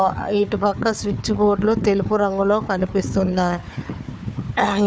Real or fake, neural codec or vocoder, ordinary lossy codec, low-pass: fake; codec, 16 kHz, 4 kbps, FunCodec, trained on Chinese and English, 50 frames a second; none; none